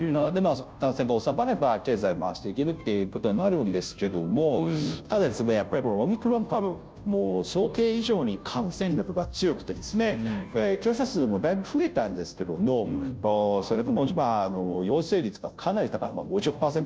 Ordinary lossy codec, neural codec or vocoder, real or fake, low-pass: none; codec, 16 kHz, 0.5 kbps, FunCodec, trained on Chinese and English, 25 frames a second; fake; none